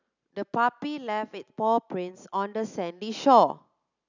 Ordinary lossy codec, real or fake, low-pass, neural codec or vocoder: none; real; 7.2 kHz; none